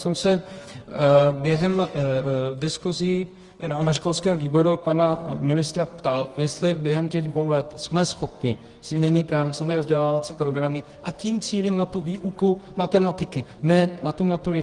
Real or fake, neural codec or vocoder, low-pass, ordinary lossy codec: fake; codec, 24 kHz, 0.9 kbps, WavTokenizer, medium music audio release; 10.8 kHz; Opus, 24 kbps